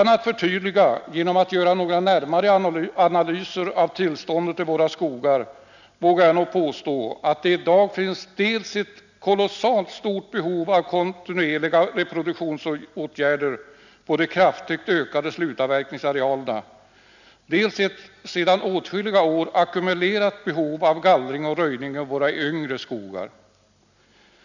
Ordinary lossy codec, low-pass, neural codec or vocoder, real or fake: none; 7.2 kHz; none; real